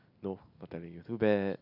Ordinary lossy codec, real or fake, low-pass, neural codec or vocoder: none; real; 5.4 kHz; none